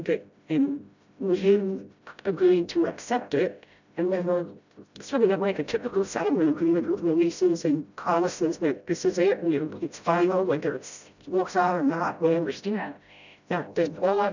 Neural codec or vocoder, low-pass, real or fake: codec, 16 kHz, 0.5 kbps, FreqCodec, smaller model; 7.2 kHz; fake